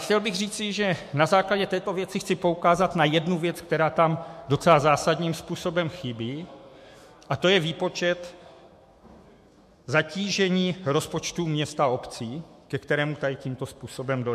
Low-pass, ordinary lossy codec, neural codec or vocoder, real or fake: 14.4 kHz; MP3, 64 kbps; autoencoder, 48 kHz, 128 numbers a frame, DAC-VAE, trained on Japanese speech; fake